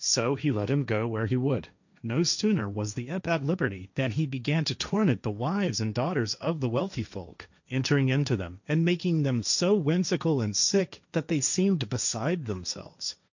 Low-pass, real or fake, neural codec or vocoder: 7.2 kHz; fake; codec, 16 kHz, 1.1 kbps, Voila-Tokenizer